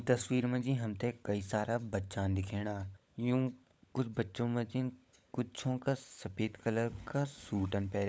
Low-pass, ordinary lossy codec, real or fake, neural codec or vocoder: none; none; fake; codec, 16 kHz, 16 kbps, FunCodec, trained on LibriTTS, 50 frames a second